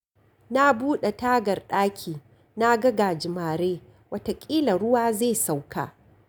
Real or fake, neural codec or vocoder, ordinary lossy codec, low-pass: real; none; none; none